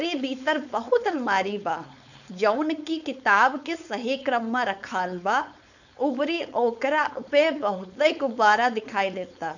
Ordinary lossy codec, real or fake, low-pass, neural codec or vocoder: none; fake; 7.2 kHz; codec, 16 kHz, 4.8 kbps, FACodec